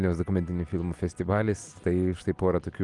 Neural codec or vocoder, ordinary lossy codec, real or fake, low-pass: none; Opus, 32 kbps; real; 10.8 kHz